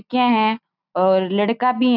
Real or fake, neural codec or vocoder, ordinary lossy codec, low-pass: real; none; none; 5.4 kHz